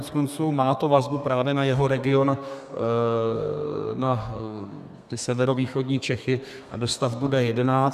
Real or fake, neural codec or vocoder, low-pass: fake; codec, 32 kHz, 1.9 kbps, SNAC; 14.4 kHz